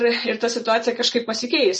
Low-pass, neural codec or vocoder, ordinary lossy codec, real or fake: 10.8 kHz; none; MP3, 32 kbps; real